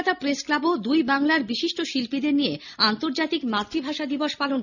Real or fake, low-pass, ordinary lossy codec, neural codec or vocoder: real; 7.2 kHz; none; none